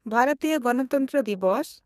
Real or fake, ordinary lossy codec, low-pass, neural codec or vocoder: fake; none; 14.4 kHz; codec, 32 kHz, 1.9 kbps, SNAC